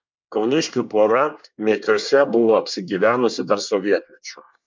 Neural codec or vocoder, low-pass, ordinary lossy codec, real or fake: codec, 24 kHz, 1 kbps, SNAC; 7.2 kHz; MP3, 64 kbps; fake